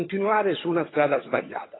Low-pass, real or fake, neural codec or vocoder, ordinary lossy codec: 7.2 kHz; fake; vocoder, 22.05 kHz, 80 mel bands, HiFi-GAN; AAC, 16 kbps